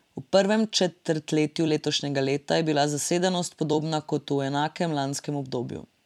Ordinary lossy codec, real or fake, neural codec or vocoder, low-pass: MP3, 96 kbps; fake; vocoder, 44.1 kHz, 128 mel bands every 256 samples, BigVGAN v2; 19.8 kHz